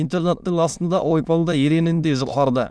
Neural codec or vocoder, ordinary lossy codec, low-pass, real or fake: autoencoder, 22.05 kHz, a latent of 192 numbers a frame, VITS, trained on many speakers; none; none; fake